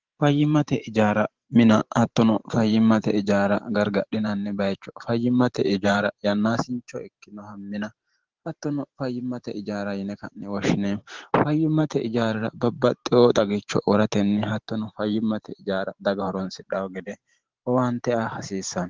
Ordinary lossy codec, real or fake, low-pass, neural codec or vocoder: Opus, 16 kbps; fake; 7.2 kHz; vocoder, 44.1 kHz, 128 mel bands every 512 samples, BigVGAN v2